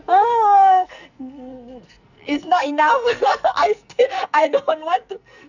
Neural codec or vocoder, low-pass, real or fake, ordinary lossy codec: codec, 44.1 kHz, 2.6 kbps, SNAC; 7.2 kHz; fake; none